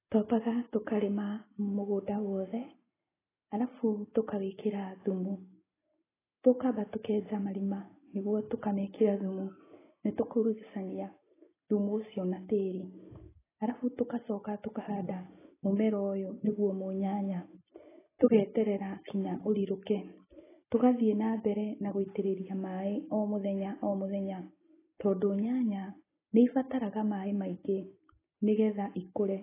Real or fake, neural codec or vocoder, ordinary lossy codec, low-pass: real; none; AAC, 16 kbps; 3.6 kHz